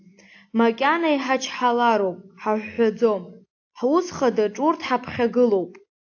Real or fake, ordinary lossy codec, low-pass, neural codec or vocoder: real; AAC, 48 kbps; 7.2 kHz; none